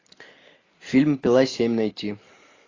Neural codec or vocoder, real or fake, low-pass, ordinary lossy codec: none; real; 7.2 kHz; AAC, 32 kbps